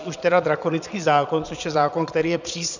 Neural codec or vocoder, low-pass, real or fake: vocoder, 22.05 kHz, 80 mel bands, Vocos; 7.2 kHz; fake